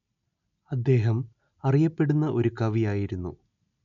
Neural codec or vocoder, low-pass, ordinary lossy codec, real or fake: none; 7.2 kHz; none; real